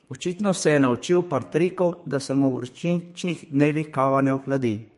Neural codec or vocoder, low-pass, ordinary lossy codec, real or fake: codec, 32 kHz, 1.9 kbps, SNAC; 14.4 kHz; MP3, 48 kbps; fake